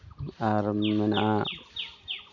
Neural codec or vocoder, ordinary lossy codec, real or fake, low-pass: none; none; real; 7.2 kHz